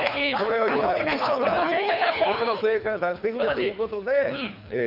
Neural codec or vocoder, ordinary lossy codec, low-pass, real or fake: codec, 24 kHz, 3 kbps, HILCodec; none; 5.4 kHz; fake